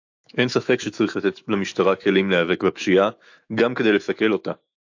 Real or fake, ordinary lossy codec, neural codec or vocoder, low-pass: fake; AAC, 48 kbps; autoencoder, 48 kHz, 128 numbers a frame, DAC-VAE, trained on Japanese speech; 7.2 kHz